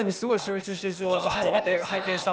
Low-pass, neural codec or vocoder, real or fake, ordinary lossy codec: none; codec, 16 kHz, 0.8 kbps, ZipCodec; fake; none